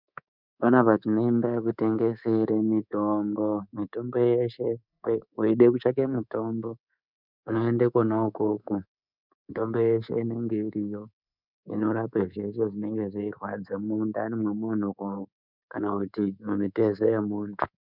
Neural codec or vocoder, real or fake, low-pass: codec, 24 kHz, 3.1 kbps, DualCodec; fake; 5.4 kHz